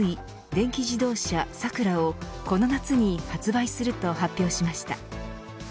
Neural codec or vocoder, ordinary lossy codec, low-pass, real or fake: none; none; none; real